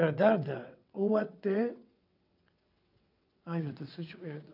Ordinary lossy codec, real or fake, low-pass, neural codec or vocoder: none; fake; 5.4 kHz; vocoder, 22.05 kHz, 80 mel bands, WaveNeXt